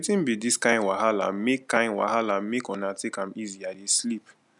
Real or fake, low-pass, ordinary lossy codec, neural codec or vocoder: real; 10.8 kHz; none; none